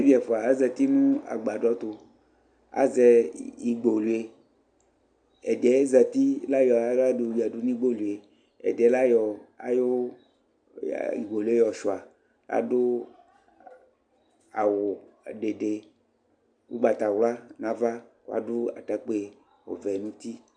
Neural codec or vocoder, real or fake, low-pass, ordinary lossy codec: none; real; 9.9 kHz; MP3, 96 kbps